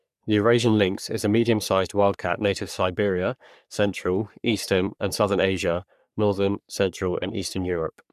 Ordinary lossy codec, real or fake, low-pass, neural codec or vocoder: none; fake; 14.4 kHz; codec, 44.1 kHz, 3.4 kbps, Pupu-Codec